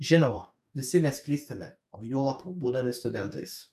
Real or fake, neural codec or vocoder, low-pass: fake; codec, 44.1 kHz, 2.6 kbps, DAC; 14.4 kHz